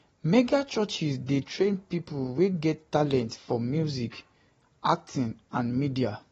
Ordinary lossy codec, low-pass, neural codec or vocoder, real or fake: AAC, 24 kbps; 19.8 kHz; none; real